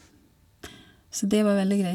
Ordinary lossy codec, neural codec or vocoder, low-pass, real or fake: none; none; 19.8 kHz; real